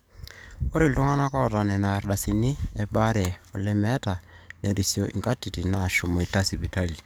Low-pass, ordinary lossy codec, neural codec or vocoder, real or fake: none; none; codec, 44.1 kHz, 7.8 kbps, DAC; fake